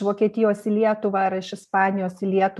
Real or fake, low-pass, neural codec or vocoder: real; 14.4 kHz; none